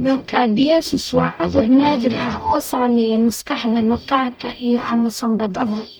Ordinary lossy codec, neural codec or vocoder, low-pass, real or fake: none; codec, 44.1 kHz, 0.9 kbps, DAC; none; fake